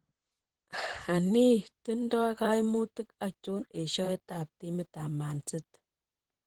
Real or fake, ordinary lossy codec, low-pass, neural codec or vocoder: fake; Opus, 16 kbps; 19.8 kHz; vocoder, 44.1 kHz, 128 mel bands, Pupu-Vocoder